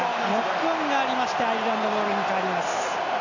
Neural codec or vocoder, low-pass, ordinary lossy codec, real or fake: none; 7.2 kHz; none; real